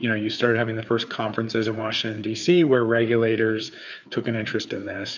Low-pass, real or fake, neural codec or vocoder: 7.2 kHz; fake; codec, 16 kHz, 4 kbps, FreqCodec, larger model